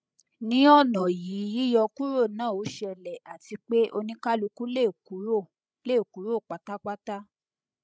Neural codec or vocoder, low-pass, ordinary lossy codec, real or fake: codec, 16 kHz, 16 kbps, FreqCodec, larger model; none; none; fake